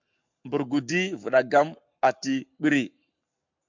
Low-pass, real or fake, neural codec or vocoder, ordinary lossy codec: 7.2 kHz; fake; codec, 44.1 kHz, 7.8 kbps, Pupu-Codec; MP3, 64 kbps